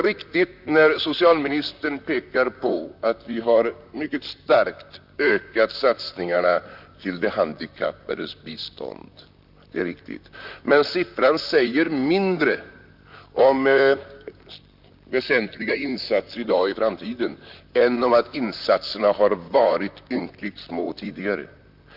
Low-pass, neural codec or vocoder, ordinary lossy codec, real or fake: 5.4 kHz; vocoder, 44.1 kHz, 128 mel bands, Pupu-Vocoder; none; fake